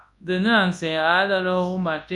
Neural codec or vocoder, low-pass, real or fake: codec, 24 kHz, 0.9 kbps, WavTokenizer, large speech release; 10.8 kHz; fake